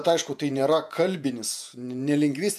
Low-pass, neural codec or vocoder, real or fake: 14.4 kHz; vocoder, 48 kHz, 128 mel bands, Vocos; fake